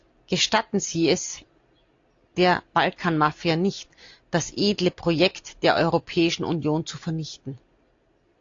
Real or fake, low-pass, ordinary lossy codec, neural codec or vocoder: real; 7.2 kHz; AAC, 48 kbps; none